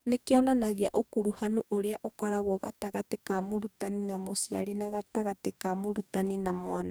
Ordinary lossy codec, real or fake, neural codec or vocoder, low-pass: none; fake; codec, 44.1 kHz, 2.6 kbps, DAC; none